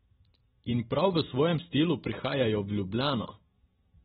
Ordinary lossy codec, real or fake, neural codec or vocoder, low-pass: AAC, 16 kbps; real; none; 19.8 kHz